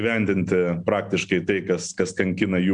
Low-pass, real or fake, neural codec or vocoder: 9.9 kHz; real; none